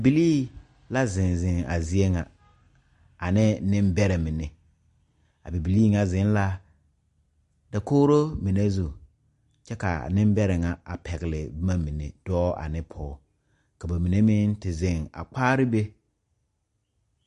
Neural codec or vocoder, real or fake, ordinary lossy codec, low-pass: none; real; MP3, 48 kbps; 14.4 kHz